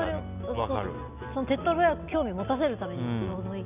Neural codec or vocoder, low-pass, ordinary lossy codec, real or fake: none; 3.6 kHz; none; real